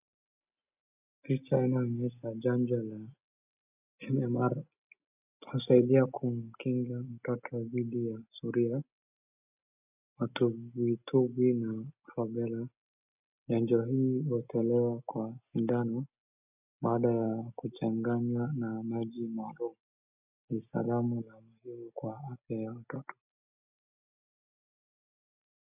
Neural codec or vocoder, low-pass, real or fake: none; 3.6 kHz; real